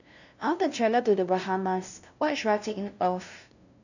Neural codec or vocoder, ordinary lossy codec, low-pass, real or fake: codec, 16 kHz, 0.5 kbps, FunCodec, trained on LibriTTS, 25 frames a second; none; 7.2 kHz; fake